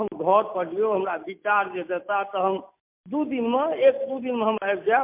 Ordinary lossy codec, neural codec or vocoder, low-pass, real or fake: MP3, 32 kbps; none; 3.6 kHz; real